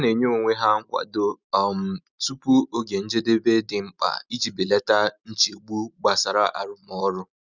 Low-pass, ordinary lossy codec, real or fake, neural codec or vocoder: 7.2 kHz; none; real; none